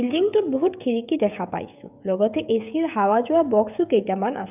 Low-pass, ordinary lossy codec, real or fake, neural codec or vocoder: 3.6 kHz; none; fake; codec, 16 kHz, 16 kbps, FreqCodec, smaller model